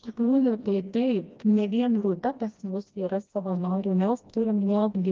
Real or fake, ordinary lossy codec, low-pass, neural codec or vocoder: fake; Opus, 24 kbps; 7.2 kHz; codec, 16 kHz, 1 kbps, FreqCodec, smaller model